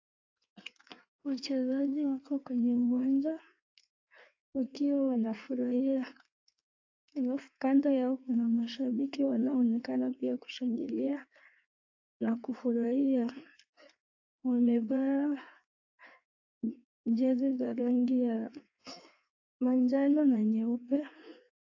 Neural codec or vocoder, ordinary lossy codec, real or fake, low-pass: codec, 16 kHz in and 24 kHz out, 1.1 kbps, FireRedTTS-2 codec; AAC, 48 kbps; fake; 7.2 kHz